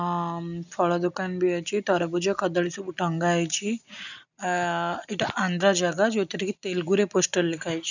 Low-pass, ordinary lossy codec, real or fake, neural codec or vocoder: 7.2 kHz; none; real; none